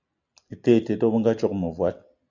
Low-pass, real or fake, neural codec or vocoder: 7.2 kHz; real; none